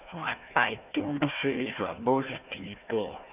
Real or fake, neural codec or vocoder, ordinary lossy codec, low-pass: fake; codec, 16 kHz, 1 kbps, FunCodec, trained on Chinese and English, 50 frames a second; none; 3.6 kHz